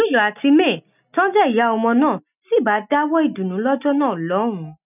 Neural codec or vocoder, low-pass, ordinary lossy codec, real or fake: none; 3.6 kHz; none; real